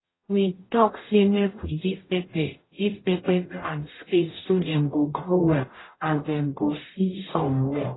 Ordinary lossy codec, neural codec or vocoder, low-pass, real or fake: AAC, 16 kbps; codec, 44.1 kHz, 0.9 kbps, DAC; 7.2 kHz; fake